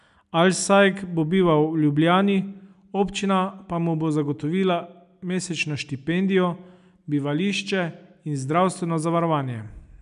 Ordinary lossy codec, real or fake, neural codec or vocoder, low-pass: none; real; none; 10.8 kHz